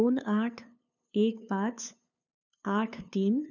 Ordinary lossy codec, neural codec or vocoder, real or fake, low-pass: none; codec, 16 kHz, 2 kbps, FunCodec, trained on LibriTTS, 25 frames a second; fake; 7.2 kHz